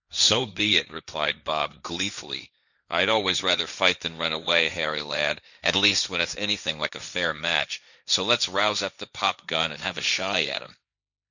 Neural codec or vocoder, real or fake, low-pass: codec, 16 kHz, 1.1 kbps, Voila-Tokenizer; fake; 7.2 kHz